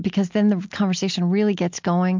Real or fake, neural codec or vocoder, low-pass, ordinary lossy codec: real; none; 7.2 kHz; MP3, 64 kbps